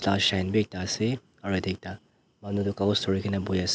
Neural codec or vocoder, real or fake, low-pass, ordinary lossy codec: none; real; none; none